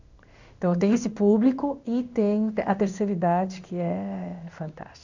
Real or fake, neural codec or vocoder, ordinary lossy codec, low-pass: fake; codec, 16 kHz in and 24 kHz out, 1 kbps, XY-Tokenizer; none; 7.2 kHz